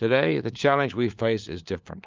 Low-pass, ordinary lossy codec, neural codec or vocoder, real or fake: 7.2 kHz; Opus, 24 kbps; codec, 16 kHz, 4 kbps, FunCodec, trained on LibriTTS, 50 frames a second; fake